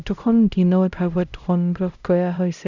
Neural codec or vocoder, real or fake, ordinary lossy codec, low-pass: codec, 16 kHz, 0.5 kbps, X-Codec, HuBERT features, trained on LibriSpeech; fake; none; 7.2 kHz